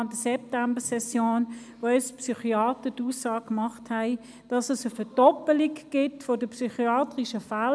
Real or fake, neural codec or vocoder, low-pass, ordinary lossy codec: real; none; none; none